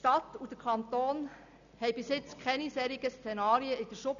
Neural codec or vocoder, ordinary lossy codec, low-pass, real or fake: none; none; 7.2 kHz; real